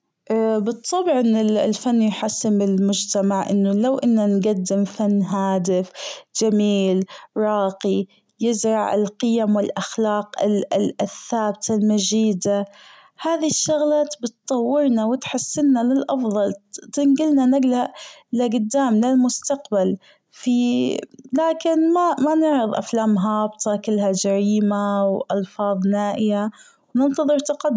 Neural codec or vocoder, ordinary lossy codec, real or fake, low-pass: none; none; real; none